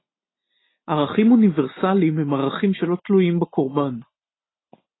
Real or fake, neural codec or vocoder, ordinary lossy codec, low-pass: real; none; AAC, 16 kbps; 7.2 kHz